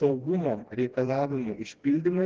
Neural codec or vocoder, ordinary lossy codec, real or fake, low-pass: codec, 16 kHz, 1 kbps, FreqCodec, smaller model; Opus, 16 kbps; fake; 7.2 kHz